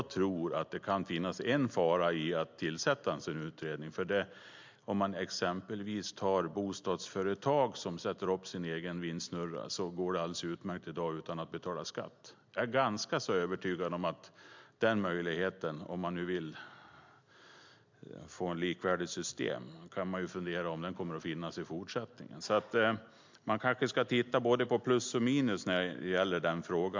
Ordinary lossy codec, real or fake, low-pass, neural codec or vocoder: MP3, 64 kbps; real; 7.2 kHz; none